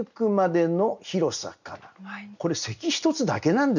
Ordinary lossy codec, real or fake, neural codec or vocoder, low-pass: none; real; none; 7.2 kHz